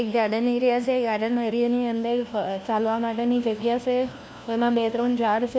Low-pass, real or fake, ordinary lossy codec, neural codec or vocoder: none; fake; none; codec, 16 kHz, 1 kbps, FunCodec, trained on LibriTTS, 50 frames a second